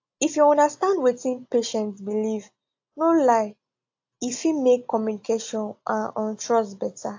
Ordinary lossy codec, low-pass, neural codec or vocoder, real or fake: AAC, 48 kbps; 7.2 kHz; none; real